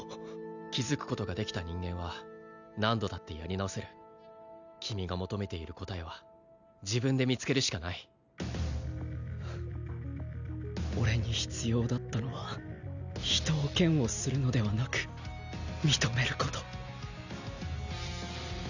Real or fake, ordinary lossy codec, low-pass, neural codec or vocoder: real; none; 7.2 kHz; none